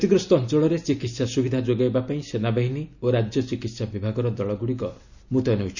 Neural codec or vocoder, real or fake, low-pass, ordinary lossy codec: none; real; 7.2 kHz; none